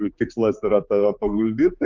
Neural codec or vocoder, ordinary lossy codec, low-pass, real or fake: codec, 16 kHz, 4 kbps, X-Codec, HuBERT features, trained on general audio; Opus, 32 kbps; 7.2 kHz; fake